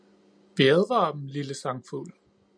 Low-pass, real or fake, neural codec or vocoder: 9.9 kHz; real; none